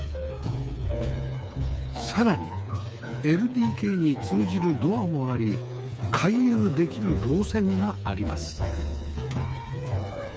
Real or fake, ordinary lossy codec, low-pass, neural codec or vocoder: fake; none; none; codec, 16 kHz, 4 kbps, FreqCodec, smaller model